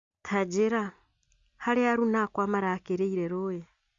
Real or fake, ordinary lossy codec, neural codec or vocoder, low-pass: real; Opus, 64 kbps; none; 7.2 kHz